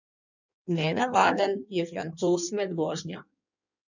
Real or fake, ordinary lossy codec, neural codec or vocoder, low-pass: fake; none; codec, 16 kHz in and 24 kHz out, 1.1 kbps, FireRedTTS-2 codec; 7.2 kHz